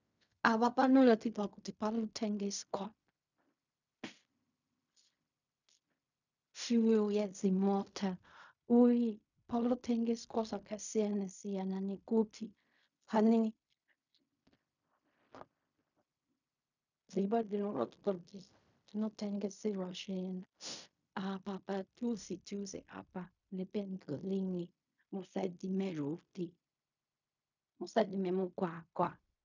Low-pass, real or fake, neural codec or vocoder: 7.2 kHz; fake; codec, 16 kHz in and 24 kHz out, 0.4 kbps, LongCat-Audio-Codec, fine tuned four codebook decoder